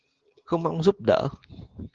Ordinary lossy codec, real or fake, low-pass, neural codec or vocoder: Opus, 16 kbps; real; 7.2 kHz; none